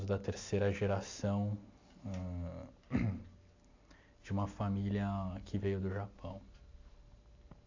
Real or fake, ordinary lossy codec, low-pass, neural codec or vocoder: real; AAC, 48 kbps; 7.2 kHz; none